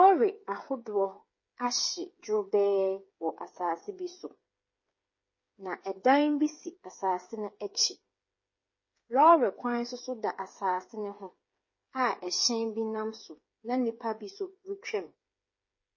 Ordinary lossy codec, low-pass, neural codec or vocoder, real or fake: MP3, 32 kbps; 7.2 kHz; codec, 16 kHz, 8 kbps, FreqCodec, smaller model; fake